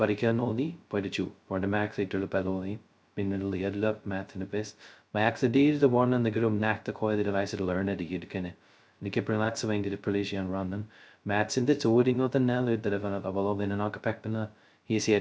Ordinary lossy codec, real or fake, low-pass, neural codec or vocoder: none; fake; none; codec, 16 kHz, 0.2 kbps, FocalCodec